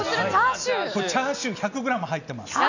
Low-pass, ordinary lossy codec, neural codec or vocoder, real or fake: 7.2 kHz; none; none; real